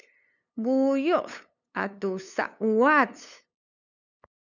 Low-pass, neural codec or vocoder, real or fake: 7.2 kHz; codec, 16 kHz, 8 kbps, FunCodec, trained on LibriTTS, 25 frames a second; fake